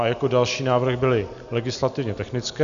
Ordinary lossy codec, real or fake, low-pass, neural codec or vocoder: AAC, 96 kbps; real; 7.2 kHz; none